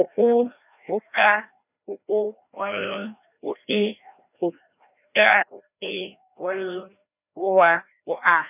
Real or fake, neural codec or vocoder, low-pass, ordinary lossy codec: fake; codec, 16 kHz, 1 kbps, FreqCodec, larger model; 3.6 kHz; none